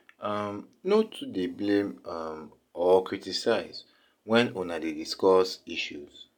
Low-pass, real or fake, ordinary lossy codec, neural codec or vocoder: 19.8 kHz; real; none; none